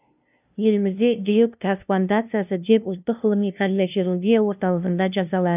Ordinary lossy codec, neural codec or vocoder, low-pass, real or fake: none; codec, 16 kHz, 0.5 kbps, FunCodec, trained on LibriTTS, 25 frames a second; 3.6 kHz; fake